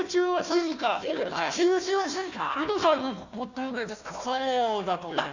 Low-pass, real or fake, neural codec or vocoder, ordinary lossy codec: 7.2 kHz; fake; codec, 16 kHz, 1 kbps, FunCodec, trained on Chinese and English, 50 frames a second; none